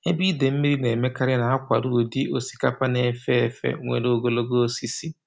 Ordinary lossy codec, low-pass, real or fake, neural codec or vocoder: none; none; real; none